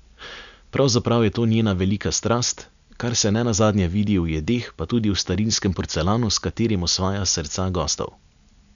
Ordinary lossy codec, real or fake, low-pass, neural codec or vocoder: none; real; 7.2 kHz; none